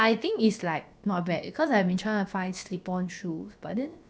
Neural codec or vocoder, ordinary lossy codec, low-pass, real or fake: codec, 16 kHz, about 1 kbps, DyCAST, with the encoder's durations; none; none; fake